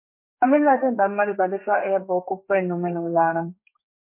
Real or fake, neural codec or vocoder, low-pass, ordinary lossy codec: fake; codec, 32 kHz, 1.9 kbps, SNAC; 3.6 kHz; MP3, 24 kbps